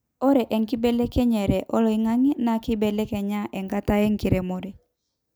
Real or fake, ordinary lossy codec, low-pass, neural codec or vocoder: real; none; none; none